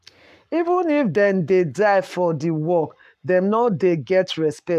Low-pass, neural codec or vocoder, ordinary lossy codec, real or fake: 14.4 kHz; codec, 44.1 kHz, 7.8 kbps, Pupu-Codec; none; fake